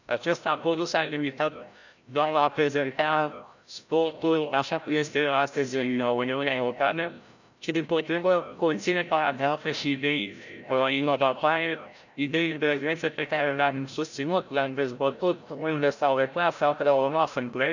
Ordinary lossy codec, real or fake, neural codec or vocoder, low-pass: none; fake; codec, 16 kHz, 0.5 kbps, FreqCodec, larger model; 7.2 kHz